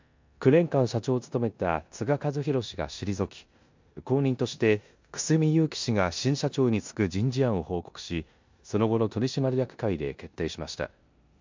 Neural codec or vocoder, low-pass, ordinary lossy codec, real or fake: codec, 16 kHz in and 24 kHz out, 0.9 kbps, LongCat-Audio-Codec, four codebook decoder; 7.2 kHz; MP3, 64 kbps; fake